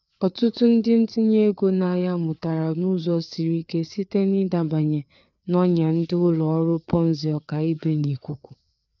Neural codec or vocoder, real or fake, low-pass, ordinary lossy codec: codec, 16 kHz, 4 kbps, FreqCodec, larger model; fake; 7.2 kHz; none